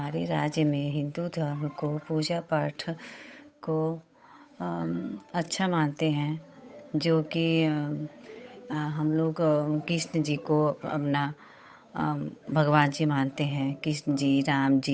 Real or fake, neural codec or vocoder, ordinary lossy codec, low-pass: fake; codec, 16 kHz, 8 kbps, FunCodec, trained on Chinese and English, 25 frames a second; none; none